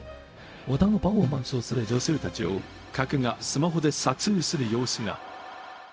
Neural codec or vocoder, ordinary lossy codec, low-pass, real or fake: codec, 16 kHz, 0.4 kbps, LongCat-Audio-Codec; none; none; fake